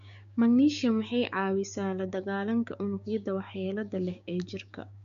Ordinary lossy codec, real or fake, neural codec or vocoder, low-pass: none; fake; codec, 16 kHz, 6 kbps, DAC; 7.2 kHz